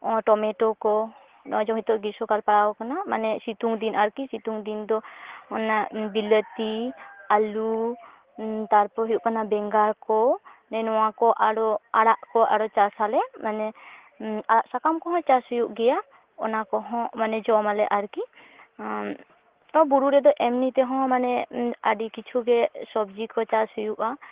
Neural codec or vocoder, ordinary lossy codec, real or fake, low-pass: none; Opus, 16 kbps; real; 3.6 kHz